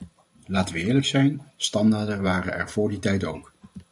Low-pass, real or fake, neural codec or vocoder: 10.8 kHz; fake; vocoder, 44.1 kHz, 128 mel bands every 512 samples, BigVGAN v2